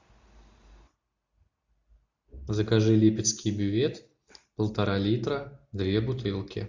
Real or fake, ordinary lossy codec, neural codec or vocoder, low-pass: real; Opus, 64 kbps; none; 7.2 kHz